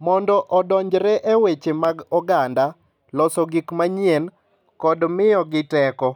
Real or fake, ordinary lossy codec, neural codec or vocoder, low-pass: real; none; none; 19.8 kHz